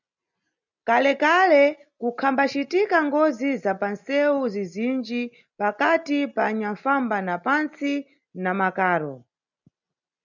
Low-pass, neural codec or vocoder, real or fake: 7.2 kHz; none; real